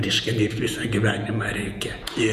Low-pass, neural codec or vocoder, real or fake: 14.4 kHz; vocoder, 48 kHz, 128 mel bands, Vocos; fake